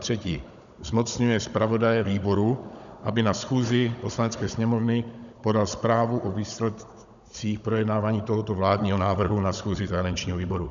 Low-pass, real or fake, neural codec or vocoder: 7.2 kHz; fake; codec, 16 kHz, 16 kbps, FunCodec, trained on Chinese and English, 50 frames a second